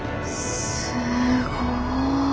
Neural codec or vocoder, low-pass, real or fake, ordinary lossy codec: none; none; real; none